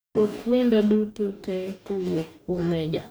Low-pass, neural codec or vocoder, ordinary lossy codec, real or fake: none; codec, 44.1 kHz, 2.6 kbps, DAC; none; fake